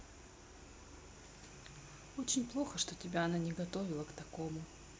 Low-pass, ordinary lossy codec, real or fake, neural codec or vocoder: none; none; real; none